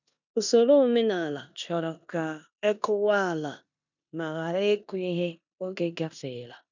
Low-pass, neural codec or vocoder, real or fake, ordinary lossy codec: 7.2 kHz; codec, 16 kHz in and 24 kHz out, 0.9 kbps, LongCat-Audio-Codec, four codebook decoder; fake; none